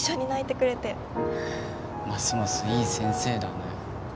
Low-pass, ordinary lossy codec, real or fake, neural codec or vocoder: none; none; real; none